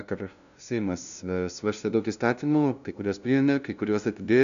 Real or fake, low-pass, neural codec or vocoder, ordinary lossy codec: fake; 7.2 kHz; codec, 16 kHz, 0.5 kbps, FunCodec, trained on LibriTTS, 25 frames a second; MP3, 96 kbps